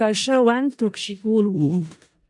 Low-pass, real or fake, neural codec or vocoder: 10.8 kHz; fake; codec, 16 kHz in and 24 kHz out, 0.4 kbps, LongCat-Audio-Codec, four codebook decoder